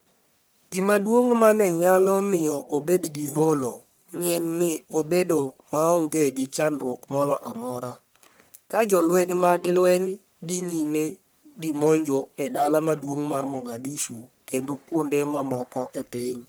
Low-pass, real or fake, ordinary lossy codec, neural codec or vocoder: none; fake; none; codec, 44.1 kHz, 1.7 kbps, Pupu-Codec